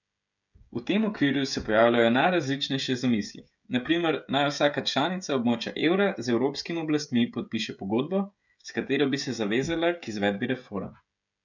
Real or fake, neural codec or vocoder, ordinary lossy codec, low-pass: fake; codec, 16 kHz, 16 kbps, FreqCodec, smaller model; none; 7.2 kHz